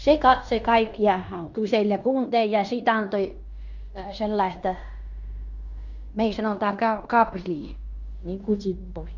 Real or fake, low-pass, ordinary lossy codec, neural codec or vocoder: fake; 7.2 kHz; none; codec, 16 kHz in and 24 kHz out, 0.9 kbps, LongCat-Audio-Codec, fine tuned four codebook decoder